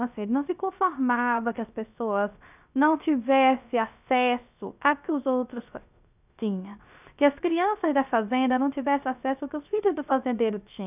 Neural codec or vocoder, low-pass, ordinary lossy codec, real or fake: codec, 16 kHz, 0.3 kbps, FocalCodec; 3.6 kHz; none; fake